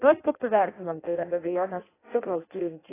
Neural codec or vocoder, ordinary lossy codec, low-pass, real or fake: codec, 16 kHz in and 24 kHz out, 0.6 kbps, FireRedTTS-2 codec; AAC, 16 kbps; 3.6 kHz; fake